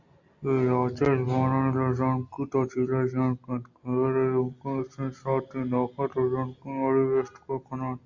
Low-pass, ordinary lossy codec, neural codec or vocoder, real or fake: 7.2 kHz; Opus, 64 kbps; none; real